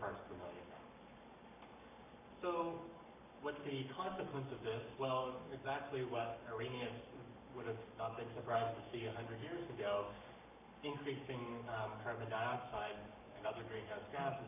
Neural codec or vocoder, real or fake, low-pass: codec, 44.1 kHz, 7.8 kbps, Pupu-Codec; fake; 3.6 kHz